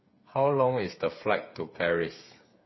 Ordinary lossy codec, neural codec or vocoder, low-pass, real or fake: MP3, 24 kbps; codec, 16 kHz, 8 kbps, FreqCodec, smaller model; 7.2 kHz; fake